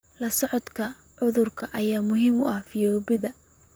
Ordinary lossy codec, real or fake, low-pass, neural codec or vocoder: none; fake; none; vocoder, 44.1 kHz, 128 mel bands, Pupu-Vocoder